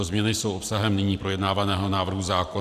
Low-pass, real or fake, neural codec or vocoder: 14.4 kHz; fake; vocoder, 44.1 kHz, 128 mel bands every 512 samples, BigVGAN v2